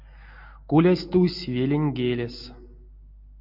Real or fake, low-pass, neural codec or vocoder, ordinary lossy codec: real; 5.4 kHz; none; MP3, 48 kbps